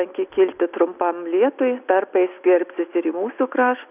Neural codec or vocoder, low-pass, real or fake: none; 3.6 kHz; real